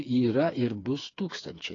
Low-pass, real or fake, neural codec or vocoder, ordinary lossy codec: 7.2 kHz; fake; codec, 16 kHz, 4 kbps, FreqCodec, smaller model; AAC, 32 kbps